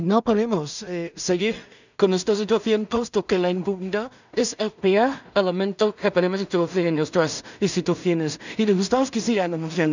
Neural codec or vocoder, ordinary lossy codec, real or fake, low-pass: codec, 16 kHz in and 24 kHz out, 0.4 kbps, LongCat-Audio-Codec, two codebook decoder; none; fake; 7.2 kHz